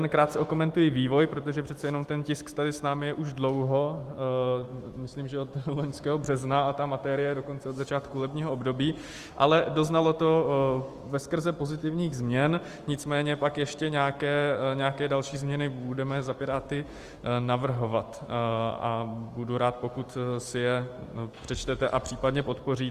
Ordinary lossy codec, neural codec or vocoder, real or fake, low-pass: Opus, 24 kbps; autoencoder, 48 kHz, 128 numbers a frame, DAC-VAE, trained on Japanese speech; fake; 14.4 kHz